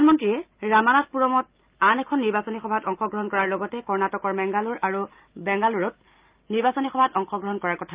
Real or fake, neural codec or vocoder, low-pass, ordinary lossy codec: real; none; 3.6 kHz; Opus, 24 kbps